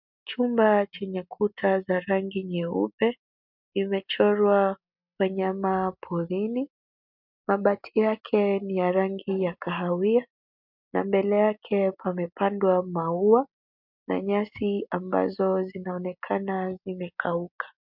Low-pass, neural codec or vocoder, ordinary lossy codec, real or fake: 5.4 kHz; none; MP3, 48 kbps; real